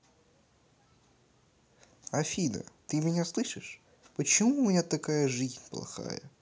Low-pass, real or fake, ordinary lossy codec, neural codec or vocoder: none; real; none; none